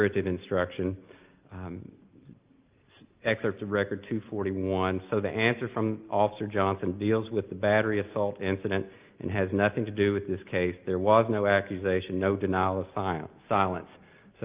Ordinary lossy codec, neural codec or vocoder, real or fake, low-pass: Opus, 24 kbps; none; real; 3.6 kHz